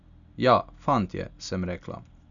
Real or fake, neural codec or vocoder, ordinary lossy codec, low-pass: real; none; none; 7.2 kHz